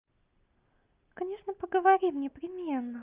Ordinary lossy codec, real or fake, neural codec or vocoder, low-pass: Opus, 24 kbps; real; none; 3.6 kHz